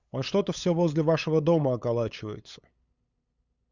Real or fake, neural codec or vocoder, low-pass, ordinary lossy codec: fake; codec, 16 kHz, 8 kbps, FunCodec, trained on LibriTTS, 25 frames a second; 7.2 kHz; Opus, 64 kbps